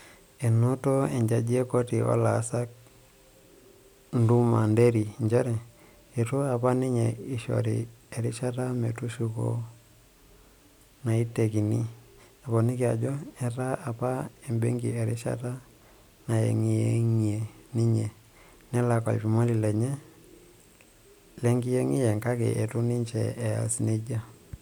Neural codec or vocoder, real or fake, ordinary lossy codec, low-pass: none; real; none; none